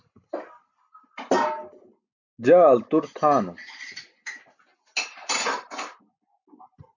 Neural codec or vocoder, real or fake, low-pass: none; real; 7.2 kHz